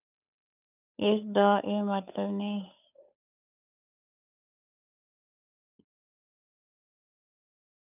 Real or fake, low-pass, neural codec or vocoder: fake; 3.6 kHz; codec, 16 kHz, 8 kbps, FunCodec, trained on Chinese and English, 25 frames a second